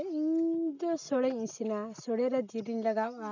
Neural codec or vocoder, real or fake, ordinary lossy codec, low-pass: none; real; none; 7.2 kHz